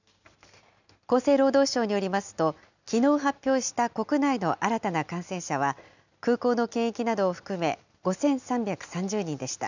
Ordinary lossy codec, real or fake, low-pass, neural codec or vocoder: none; real; 7.2 kHz; none